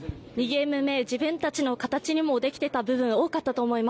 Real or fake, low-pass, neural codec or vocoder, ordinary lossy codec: real; none; none; none